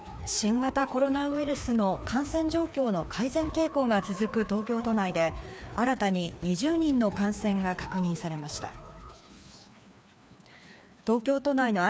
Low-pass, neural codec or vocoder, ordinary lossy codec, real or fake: none; codec, 16 kHz, 2 kbps, FreqCodec, larger model; none; fake